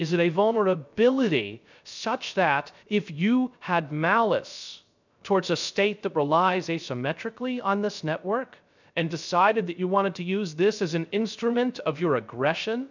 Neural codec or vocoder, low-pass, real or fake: codec, 16 kHz, 0.3 kbps, FocalCodec; 7.2 kHz; fake